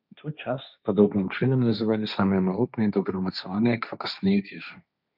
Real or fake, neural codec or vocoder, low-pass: fake; codec, 16 kHz, 1.1 kbps, Voila-Tokenizer; 5.4 kHz